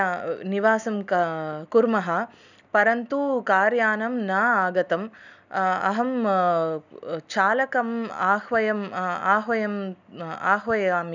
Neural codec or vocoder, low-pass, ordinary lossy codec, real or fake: none; 7.2 kHz; none; real